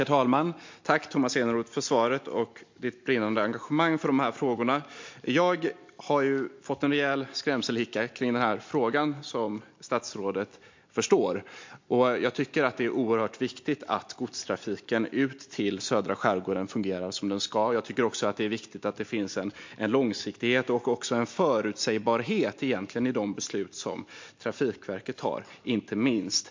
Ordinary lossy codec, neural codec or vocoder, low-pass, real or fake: MP3, 48 kbps; none; 7.2 kHz; real